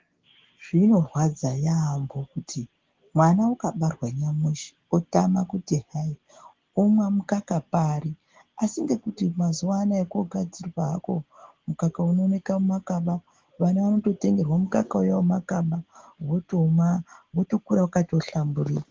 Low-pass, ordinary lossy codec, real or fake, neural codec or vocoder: 7.2 kHz; Opus, 16 kbps; real; none